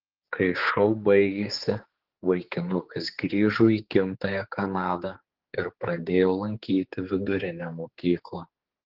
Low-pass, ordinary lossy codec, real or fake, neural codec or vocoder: 5.4 kHz; Opus, 16 kbps; fake; codec, 16 kHz, 4 kbps, X-Codec, HuBERT features, trained on general audio